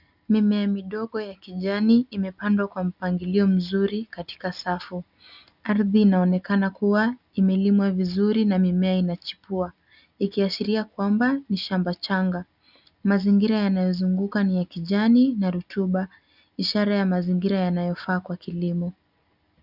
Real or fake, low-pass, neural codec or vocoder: real; 5.4 kHz; none